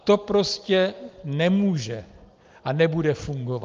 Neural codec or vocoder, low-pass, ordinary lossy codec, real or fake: none; 7.2 kHz; Opus, 32 kbps; real